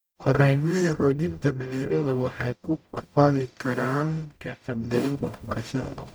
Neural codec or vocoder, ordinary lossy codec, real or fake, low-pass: codec, 44.1 kHz, 0.9 kbps, DAC; none; fake; none